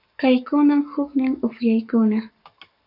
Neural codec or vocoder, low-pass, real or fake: codec, 44.1 kHz, 7.8 kbps, Pupu-Codec; 5.4 kHz; fake